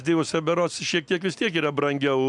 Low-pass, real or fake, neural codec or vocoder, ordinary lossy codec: 10.8 kHz; real; none; MP3, 96 kbps